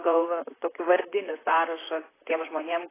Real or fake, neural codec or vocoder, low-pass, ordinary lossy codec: fake; vocoder, 44.1 kHz, 128 mel bands, Pupu-Vocoder; 3.6 kHz; AAC, 16 kbps